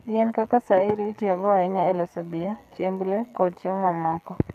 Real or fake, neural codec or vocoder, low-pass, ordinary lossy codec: fake; codec, 44.1 kHz, 2.6 kbps, SNAC; 14.4 kHz; none